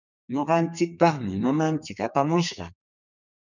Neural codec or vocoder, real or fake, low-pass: codec, 32 kHz, 1.9 kbps, SNAC; fake; 7.2 kHz